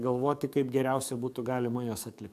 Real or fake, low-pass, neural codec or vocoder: fake; 14.4 kHz; codec, 44.1 kHz, 7.8 kbps, DAC